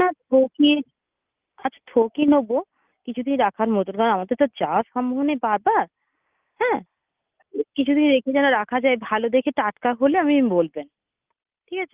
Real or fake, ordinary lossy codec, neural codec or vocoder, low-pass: real; Opus, 32 kbps; none; 3.6 kHz